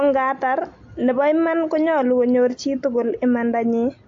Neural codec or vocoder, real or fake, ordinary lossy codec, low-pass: none; real; AAC, 48 kbps; 7.2 kHz